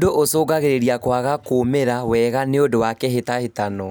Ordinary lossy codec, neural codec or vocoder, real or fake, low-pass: none; none; real; none